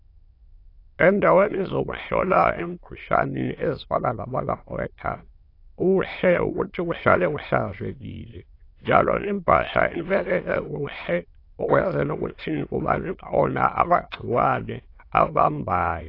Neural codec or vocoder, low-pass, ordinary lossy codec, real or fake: autoencoder, 22.05 kHz, a latent of 192 numbers a frame, VITS, trained on many speakers; 5.4 kHz; AAC, 32 kbps; fake